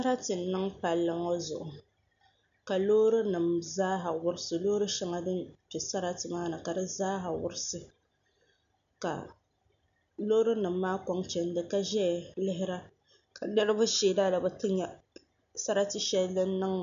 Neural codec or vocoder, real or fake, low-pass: none; real; 7.2 kHz